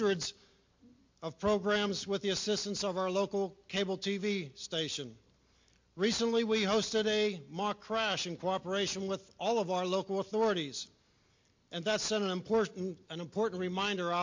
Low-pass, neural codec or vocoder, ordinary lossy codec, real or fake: 7.2 kHz; none; MP3, 48 kbps; real